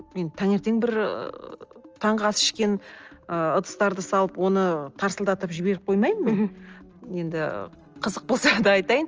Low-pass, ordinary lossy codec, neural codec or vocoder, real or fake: 7.2 kHz; Opus, 24 kbps; none; real